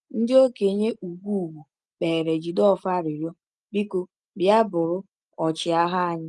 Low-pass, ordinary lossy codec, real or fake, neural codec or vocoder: 10.8 kHz; Opus, 32 kbps; real; none